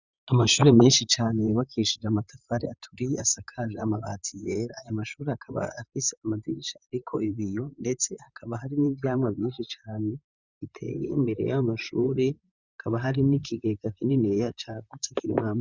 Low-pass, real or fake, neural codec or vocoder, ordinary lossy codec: 7.2 kHz; fake; vocoder, 44.1 kHz, 128 mel bands, Pupu-Vocoder; Opus, 64 kbps